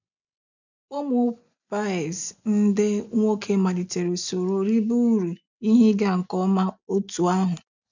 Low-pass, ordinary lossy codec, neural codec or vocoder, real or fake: 7.2 kHz; none; none; real